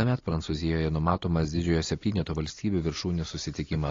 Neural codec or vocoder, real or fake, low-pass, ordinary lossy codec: none; real; 7.2 kHz; AAC, 32 kbps